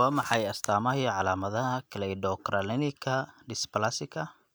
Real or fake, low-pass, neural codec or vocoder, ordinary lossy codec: real; none; none; none